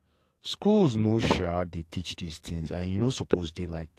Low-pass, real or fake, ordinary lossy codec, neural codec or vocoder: 14.4 kHz; fake; AAC, 64 kbps; codec, 44.1 kHz, 2.6 kbps, SNAC